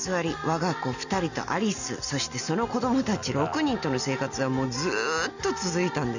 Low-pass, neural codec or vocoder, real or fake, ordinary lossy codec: 7.2 kHz; none; real; none